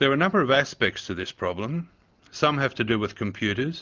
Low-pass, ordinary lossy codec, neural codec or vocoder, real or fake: 7.2 kHz; Opus, 32 kbps; none; real